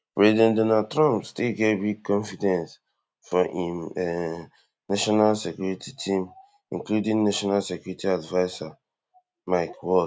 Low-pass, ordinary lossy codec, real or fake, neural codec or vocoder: none; none; real; none